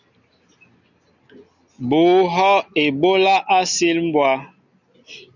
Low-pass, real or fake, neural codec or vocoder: 7.2 kHz; real; none